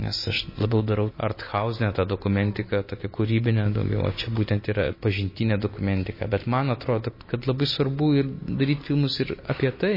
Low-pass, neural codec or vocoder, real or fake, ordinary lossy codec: 5.4 kHz; none; real; MP3, 24 kbps